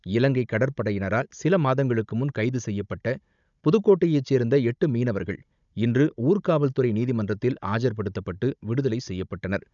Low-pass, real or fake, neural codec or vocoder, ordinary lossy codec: 7.2 kHz; fake; codec, 16 kHz, 16 kbps, FunCodec, trained on Chinese and English, 50 frames a second; none